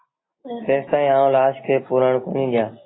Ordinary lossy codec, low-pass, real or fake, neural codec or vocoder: AAC, 16 kbps; 7.2 kHz; real; none